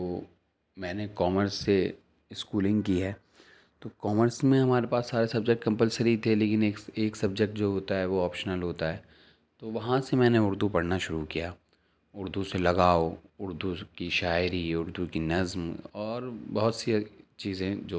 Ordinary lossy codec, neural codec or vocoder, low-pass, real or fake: none; none; none; real